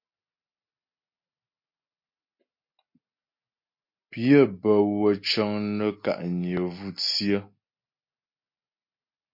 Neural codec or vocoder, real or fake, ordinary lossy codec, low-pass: none; real; MP3, 32 kbps; 5.4 kHz